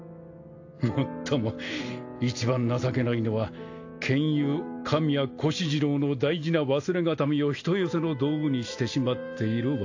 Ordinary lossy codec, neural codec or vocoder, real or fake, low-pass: AAC, 48 kbps; none; real; 7.2 kHz